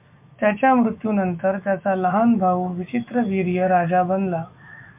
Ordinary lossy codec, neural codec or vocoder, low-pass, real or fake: MP3, 32 kbps; autoencoder, 48 kHz, 128 numbers a frame, DAC-VAE, trained on Japanese speech; 3.6 kHz; fake